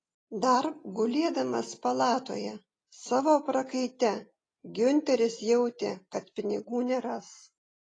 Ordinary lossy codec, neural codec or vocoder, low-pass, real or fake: AAC, 32 kbps; none; 9.9 kHz; real